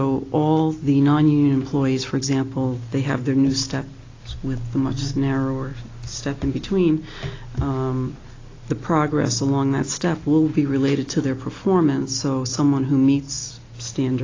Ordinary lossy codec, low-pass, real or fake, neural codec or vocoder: AAC, 32 kbps; 7.2 kHz; real; none